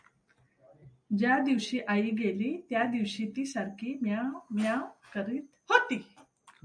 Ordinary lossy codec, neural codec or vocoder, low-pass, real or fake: MP3, 96 kbps; none; 9.9 kHz; real